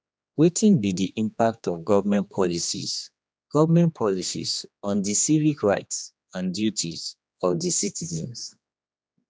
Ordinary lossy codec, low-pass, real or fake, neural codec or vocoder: none; none; fake; codec, 16 kHz, 2 kbps, X-Codec, HuBERT features, trained on general audio